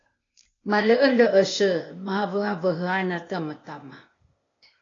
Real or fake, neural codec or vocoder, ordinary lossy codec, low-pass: fake; codec, 16 kHz, 0.8 kbps, ZipCodec; AAC, 32 kbps; 7.2 kHz